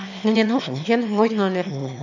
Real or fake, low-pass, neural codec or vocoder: fake; 7.2 kHz; autoencoder, 22.05 kHz, a latent of 192 numbers a frame, VITS, trained on one speaker